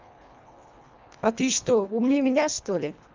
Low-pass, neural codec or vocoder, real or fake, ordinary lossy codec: 7.2 kHz; codec, 24 kHz, 1.5 kbps, HILCodec; fake; Opus, 24 kbps